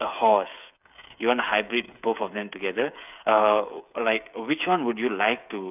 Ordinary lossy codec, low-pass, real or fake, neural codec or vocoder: none; 3.6 kHz; fake; codec, 16 kHz, 8 kbps, FreqCodec, smaller model